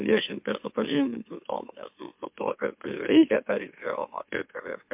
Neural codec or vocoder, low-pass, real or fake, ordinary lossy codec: autoencoder, 44.1 kHz, a latent of 192 numbers a frame, MeloTTS; 3.6 kHz; fake; MP3, 32 kbps